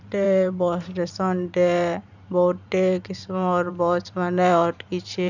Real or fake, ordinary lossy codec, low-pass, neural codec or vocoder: fake; none; 7.2 kHz; vocoder, 44.1 kHz, 128 mel bands every 512 samples, BigVGAN v2